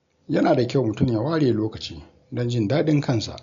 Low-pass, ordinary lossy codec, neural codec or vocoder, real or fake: 7.2 kHz; MP3, 64 kbps; none; real